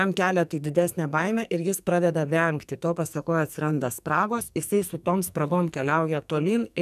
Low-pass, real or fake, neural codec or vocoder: 14.4 kHz; fake; codec, 44.1 kHz, 2.6 kbps, SNAC